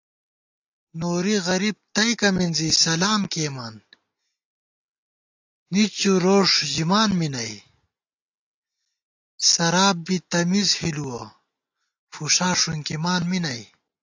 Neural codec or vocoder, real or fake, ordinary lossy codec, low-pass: none; real; AAC, 48 kbps; 7.2 kHz